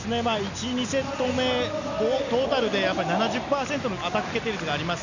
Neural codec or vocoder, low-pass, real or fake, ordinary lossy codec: none; 7.2 kHz; real; none